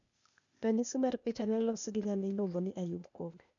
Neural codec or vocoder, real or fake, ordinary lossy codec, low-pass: codec, 16 kHz, 0.8 kbps, ZipCodec; fake; none; 7.2 kHz